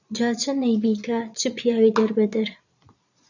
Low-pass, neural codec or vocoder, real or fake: 7.2 kHz; vocoder, 22.05 kHz, 80 mel bands, Vocos; fake